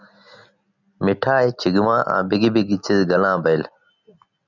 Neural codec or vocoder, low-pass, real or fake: none; 7.2 kHz; real